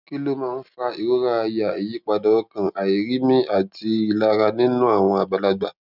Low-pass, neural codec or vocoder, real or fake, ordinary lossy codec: 5.4 kHz; none; real; none